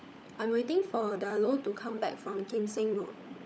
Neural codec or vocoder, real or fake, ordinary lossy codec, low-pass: codec, 16 kHz, 16 kbps, FunCodec, trained on LibriTTS, 50 frames a second; fake; none; none